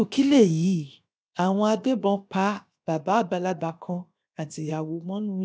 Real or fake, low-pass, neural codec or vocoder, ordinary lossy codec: fake; none; codec, 16 kHz, 0.7 kbps, FocalCodec; none